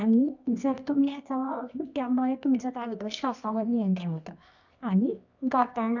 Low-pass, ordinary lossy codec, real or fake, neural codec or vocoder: 7.2 kHz; none; fake; codec, 24 kHz, 0.9 kbps, WavTokenizer, medium music audio release